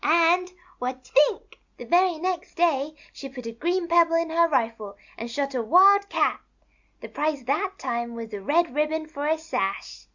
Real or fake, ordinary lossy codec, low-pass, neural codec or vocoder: real; Opus, 64 kbps; 7.2 kHz; none